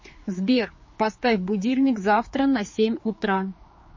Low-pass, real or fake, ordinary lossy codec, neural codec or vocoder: 7.2 kHz; fake; MP3, 32 kbps; codec, 16 kHz, 4 kbps, X-Codec, HuBERT features, trained on general audio